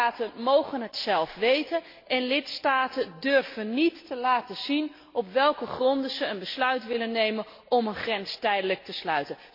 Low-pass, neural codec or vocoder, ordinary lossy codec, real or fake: 5.4 kHz; none; MP3, 32 kbps; real